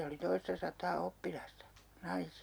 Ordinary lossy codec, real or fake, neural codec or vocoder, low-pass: none; real; none; none